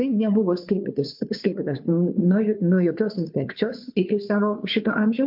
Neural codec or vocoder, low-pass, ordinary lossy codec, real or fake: codec, 16 kHz, 2 kbps, FunCodec, trained on Chinese and English, 25 frames a second; 5.4 kHz; MP3, 48 kbps; fake